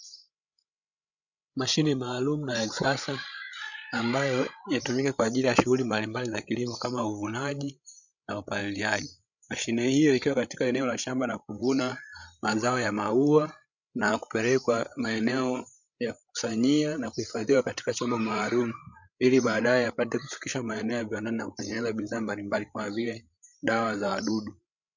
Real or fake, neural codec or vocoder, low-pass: fake; codec, 16 kHz, 16 kbps, FreqCodec, larger model; 7.2 kHz